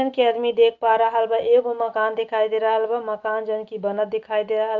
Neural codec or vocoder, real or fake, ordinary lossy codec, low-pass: none; real; Opus, 24 kbps; 7.2 kHz